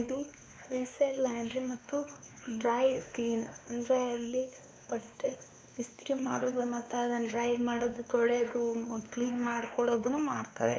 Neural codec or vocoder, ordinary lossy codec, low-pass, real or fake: codec, 16 kHz, 2 kbps, X-Codec, WavLM features, trained on Multilingual LibriSpeech; none; none; fake